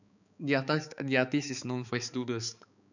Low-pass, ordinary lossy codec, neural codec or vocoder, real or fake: 7.2 kHz; none; codec, 16 kHz, 4 kbps, X-Codec, HuBERT features, trained on balanced general audio; fake